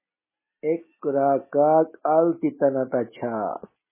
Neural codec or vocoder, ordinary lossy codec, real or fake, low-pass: none; MP3, 16 kbps; real; 3.6 kHz